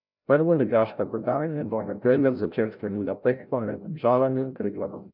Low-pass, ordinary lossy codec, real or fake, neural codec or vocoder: 5.4 kHz; none; fake; codec, 16 kHz, 0.5 kbps, FreqCodec, larger model